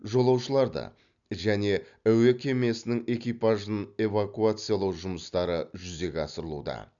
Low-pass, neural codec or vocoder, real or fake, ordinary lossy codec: 7.2 kHz; none; real; none